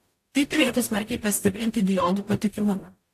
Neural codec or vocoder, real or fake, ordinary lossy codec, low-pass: codec, 44.1 kHz, 0.9 kbps, DAC; fake; AAC, 64 kbps; 14.4 kHz